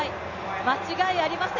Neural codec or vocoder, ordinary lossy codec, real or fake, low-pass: none; none; real; 7.2 kHz